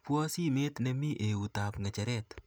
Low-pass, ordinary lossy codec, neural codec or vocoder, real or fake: none; none; none; real